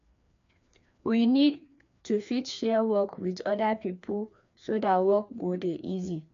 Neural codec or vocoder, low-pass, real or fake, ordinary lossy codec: codec, 16 kHz, 2 kbps, FreqCodec, larger model; 7.2 kHz; fake; MP3, 64 kbps